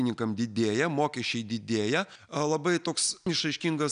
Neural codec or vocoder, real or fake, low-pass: none; real; 9.9 kHz